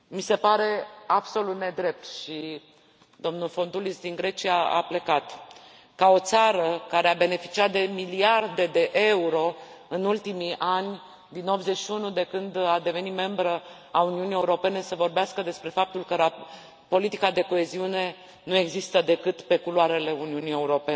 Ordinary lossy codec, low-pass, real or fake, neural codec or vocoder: none; none; real; none